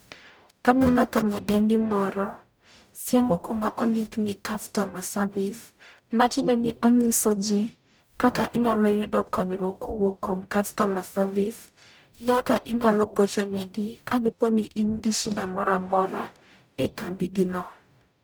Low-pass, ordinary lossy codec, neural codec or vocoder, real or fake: none; none; codec, 44.1 kHz, 0.9 kbps, DAC; fake